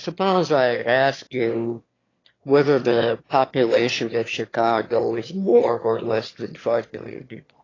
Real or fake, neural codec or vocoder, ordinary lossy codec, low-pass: fake; autoencoder, 22.05 kHz, a latent of 192 numbers a frame, VITS, trained on one speaker; AAC, 32 kbps; 7.2 kHz